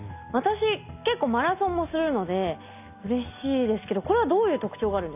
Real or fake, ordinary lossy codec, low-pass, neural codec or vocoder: real; none; 3.6 kHz; none